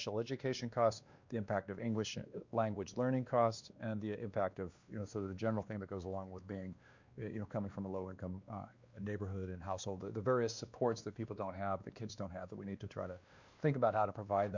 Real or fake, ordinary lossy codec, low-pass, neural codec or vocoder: fake; Opus, 64 kbps; 7.2 kHz; codec, 16 kHz, 2 kbps, X-Codec, WavLM features, trained on Multilingual LibriSpeech